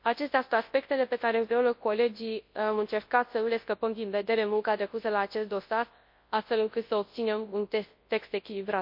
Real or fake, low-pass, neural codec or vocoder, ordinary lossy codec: fake; 5.4 kHz; codec, 24 kHz, 0.9 kbps, WavTokenizer, large speech release; MP3, 32 kbps